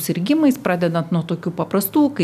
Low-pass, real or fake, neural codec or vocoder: 14.4 kHz; real; none